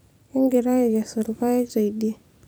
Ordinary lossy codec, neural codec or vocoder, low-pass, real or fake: none; vocoder, 44.1 kHz, 128 mel bands every 256 samples, BigVGAN v2; none; fake